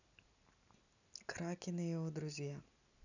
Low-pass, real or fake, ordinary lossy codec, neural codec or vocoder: 7.2 kHz; real; none; none